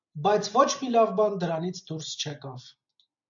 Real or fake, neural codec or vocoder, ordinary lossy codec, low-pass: real; none; MP3, 64 kbps; 7.2 kHz